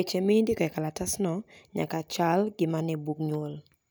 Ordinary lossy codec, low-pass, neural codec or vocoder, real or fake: none; none; none; real